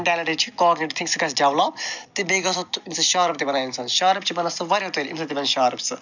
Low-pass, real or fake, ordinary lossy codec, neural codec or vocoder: 7.2 kHz; real; none; none